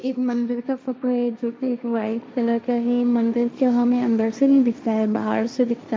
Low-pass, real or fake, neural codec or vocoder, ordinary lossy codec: none; fake; codec, 16 kHz, 1.1 kbps, Voila-Tokenizer; none